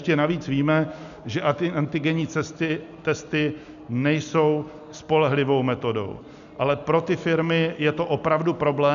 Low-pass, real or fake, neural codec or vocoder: 7.2 kHz; real; none